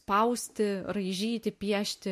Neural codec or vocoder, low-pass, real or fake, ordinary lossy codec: none; 14.4 kHz; real; MP3, 64 kbps